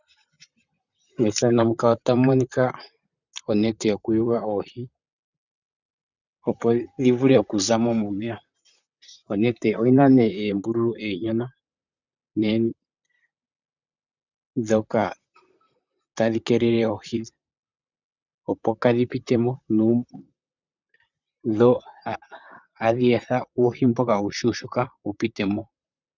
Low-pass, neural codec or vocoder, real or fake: 7.2 kHz; vocoder, 44.1 kHz, 128 mel bands, Pupu-Vocoder; fake